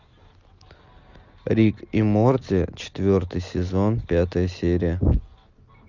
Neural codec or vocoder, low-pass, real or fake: none; 7.2 kHz; real